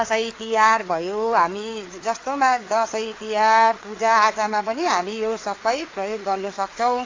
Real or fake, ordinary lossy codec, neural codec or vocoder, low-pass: fake; AAC, 32 kbps; codec, 16 kHz, 4 kbps, FreqCodec, larger model; 7.2 kHz